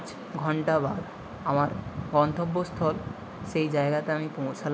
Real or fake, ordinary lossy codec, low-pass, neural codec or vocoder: real; none; none; none